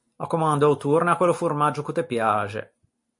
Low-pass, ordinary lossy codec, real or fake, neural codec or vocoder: 10.8 kHz; MP3, 48 kbps; real; none